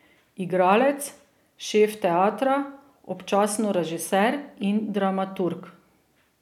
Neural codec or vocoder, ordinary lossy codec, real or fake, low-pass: none; none; real; 19.8 kHz